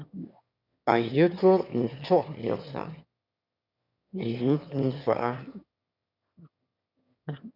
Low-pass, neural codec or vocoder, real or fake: 5.4 kHz; autoencoder, 22.05 kHz, a latent of 192 numbers a frame, VITS, trained on one speaker; fake